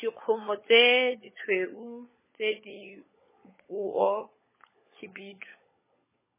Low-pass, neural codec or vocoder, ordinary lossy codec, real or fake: 3.6 kHz; codec, 16 kHz, 16 kbps, FunCodec, trained on LibriTTS, 50 frames a second; MP3, 16 kbps; fake